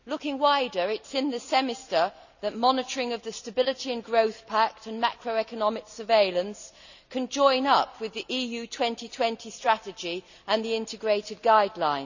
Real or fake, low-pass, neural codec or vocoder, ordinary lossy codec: real; 7.2 kHz; none; none